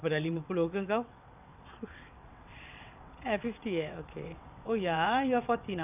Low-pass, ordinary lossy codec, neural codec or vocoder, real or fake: 3.6 kHz; none; vocoder, 22.05 kHz, 80 mel bands, WaveNeXt; fake